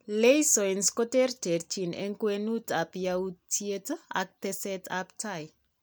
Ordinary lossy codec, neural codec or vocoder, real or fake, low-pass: none; none; real; none